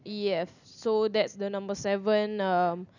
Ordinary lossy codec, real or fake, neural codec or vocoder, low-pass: none; real; none; 7.2 kHz